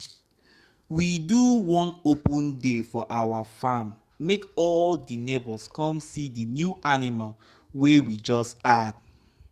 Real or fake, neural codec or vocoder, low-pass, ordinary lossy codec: fake; codec, 44.1 kHz, 2.6 kbps, SNAC; 14.4 kHz; Opus, 64 kbps